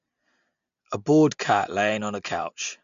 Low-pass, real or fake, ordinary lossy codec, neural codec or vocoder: 7.2 kHz; real; MP3, 64 kbps; none